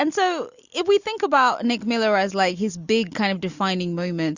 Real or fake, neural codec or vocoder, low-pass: real; none; 7.2 kHz